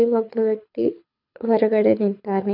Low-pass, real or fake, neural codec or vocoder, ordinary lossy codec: 5.4 kHz; fake; codec, 24 kHz, 6 kbps, HILCodec; none